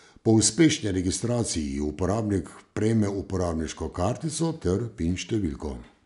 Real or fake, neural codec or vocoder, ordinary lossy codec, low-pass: real; none; none; 10.8 kHz